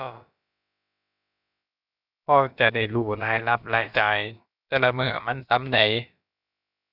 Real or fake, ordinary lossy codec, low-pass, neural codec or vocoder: fake; none; 5.4 kHz; codec, 16 kHz, about 1 kbps, DyCAST, with the encoder's durations